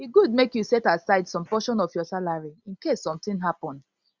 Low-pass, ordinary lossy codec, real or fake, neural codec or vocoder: 7.2 kHz; Opus, 64 kbps; real; none